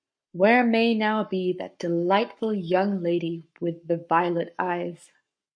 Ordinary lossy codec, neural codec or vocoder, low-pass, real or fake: MP3, 64 kbps; codec, 44.1 kHz, 7.8 kbps, Pupu-Codec; 9.9 kHz; fake